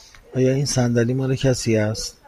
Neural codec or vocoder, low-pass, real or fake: vocoder, 44.1 kHz, 128 mel bands every 512 samples, BigVGAN v2; 14.4 kHz; fake